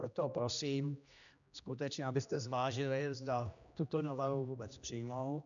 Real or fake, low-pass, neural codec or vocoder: fake; 7.2 kHz; codec, 16 kHz, 1 kbps, X-Codec, HuBERT features, trained on general audio